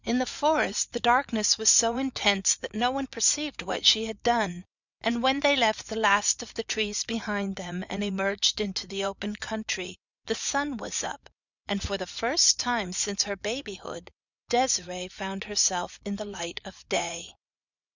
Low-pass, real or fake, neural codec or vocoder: 7.2 kHz; fake; vocoder, 44.1 kHz, 80 mel bands, Vocos